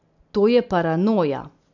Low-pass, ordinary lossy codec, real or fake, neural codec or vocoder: 7.2 kHz; none; real; none